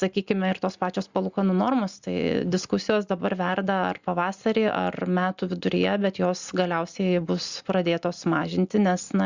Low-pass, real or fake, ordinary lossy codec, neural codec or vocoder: 7.2 kHz; real; Opus, 64 kbps; none